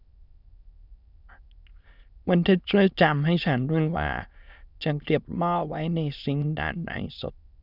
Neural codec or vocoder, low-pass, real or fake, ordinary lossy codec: autoencoder, 22.05 kHz, a latent of 192 numbers a frame, VITS, trained on many speakers; 5.4 kHz; fake; none